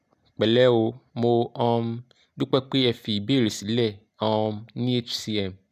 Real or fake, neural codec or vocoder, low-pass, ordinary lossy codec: real; none; 14.4 kHz; none